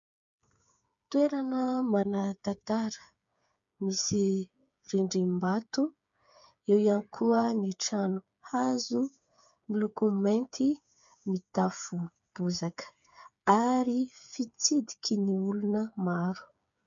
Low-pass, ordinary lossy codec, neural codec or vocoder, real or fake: 7.2 kHz; MP3, 64 kbps; codec, 16 kHz, 8 kbps, FreqCodec, smaller model; fake